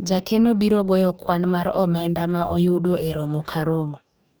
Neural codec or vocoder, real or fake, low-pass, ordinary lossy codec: codec, 44.1 kHz, 2.6 kbps, DAC; fake; none; none